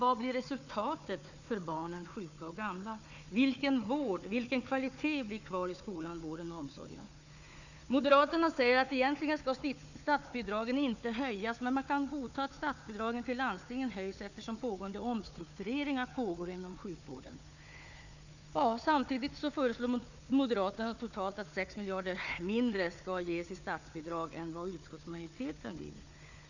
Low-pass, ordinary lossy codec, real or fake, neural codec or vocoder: 7.2 kHz; none; fake; codec, 16 kHz, 4 kbps, FunCodec, trained on Chinese and English, 50 frames a second